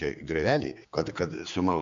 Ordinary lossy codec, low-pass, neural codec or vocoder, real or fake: MP3, 64 kbps; 7.2 kHz; codec, 16 kHz, 4 kbps, X-Codec, HuBERT features, trained on general audio; fake